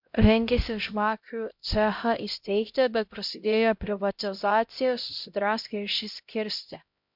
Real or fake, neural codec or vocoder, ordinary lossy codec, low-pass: fake; codec, 16 kHz, 0.5 kbps, X-Codec, HuBERT features, trained on LibriSpeech; MP3, 48 kbps; 5.4 kHz